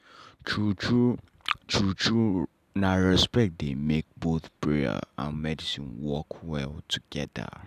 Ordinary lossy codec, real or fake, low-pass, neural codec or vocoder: none; real; 14.4 kHz; none